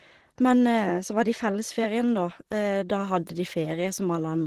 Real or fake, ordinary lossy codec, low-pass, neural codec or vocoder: fake; Opus, 16 kbps; 14.4 kHz; vocoder, 44.1 kHz, 128 mel bands, Pupu-Vocoder